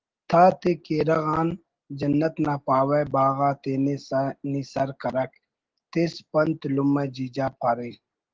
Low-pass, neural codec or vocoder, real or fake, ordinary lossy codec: 7.2 kHz; none; real; Opus, 16 kbps